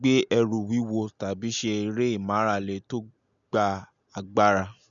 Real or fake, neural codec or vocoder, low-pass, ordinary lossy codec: real; none; 7.2 kHz; none